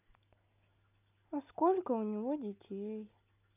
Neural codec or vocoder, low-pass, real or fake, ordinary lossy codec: none; 3.6 kHz; real; none